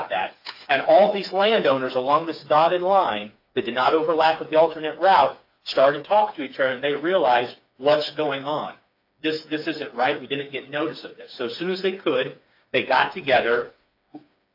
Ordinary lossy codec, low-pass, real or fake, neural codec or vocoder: AAC, 48 kbps; 5.4 kHz; fake; codec, 16 kHz, 4 kbps, FreqCodec, smaller model